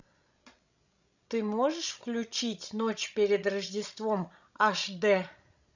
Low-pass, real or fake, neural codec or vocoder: 7.2 kHz; fake; codec, 16 kHz, 16 kbps, FreqCodec, larger model